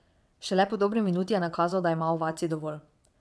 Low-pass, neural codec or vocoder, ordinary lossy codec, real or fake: none; vocoder, 22.05 kHz, 80 mel bands, WaveNeXt; none; fake